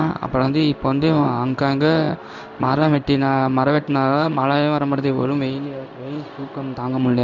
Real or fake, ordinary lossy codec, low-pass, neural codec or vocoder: fake; none; 7.2 kHz; codec, 16 kHz in and 24 kHz out, 1 kbps, XY-Tokenizer